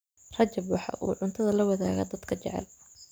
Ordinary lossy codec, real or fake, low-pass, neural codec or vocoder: none; real; none; none